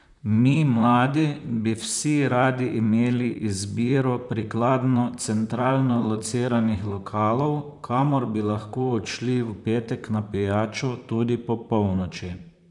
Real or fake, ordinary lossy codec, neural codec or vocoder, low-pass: fake; none; vocoder, 44.1 kHz, 128 mel bands, Pupu-Vocoder; 10.8 kHz